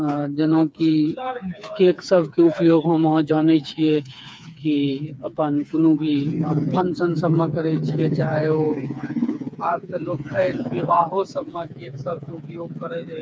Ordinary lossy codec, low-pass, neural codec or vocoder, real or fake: none; none; codec, 16 kHz, 4 kbps, FreqCodec, smaller model; fake